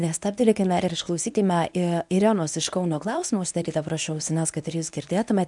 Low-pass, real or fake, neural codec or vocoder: 10.8 kHz; fake; codec, 24 kHz, 0.9 kbps, WavTokenizer, medium speech release version 1